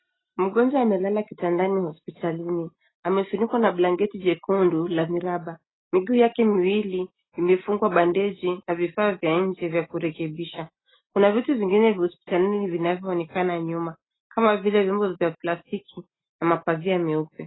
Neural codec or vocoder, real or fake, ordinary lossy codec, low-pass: none; real; AAC, 16 kbps; 7.2 kHz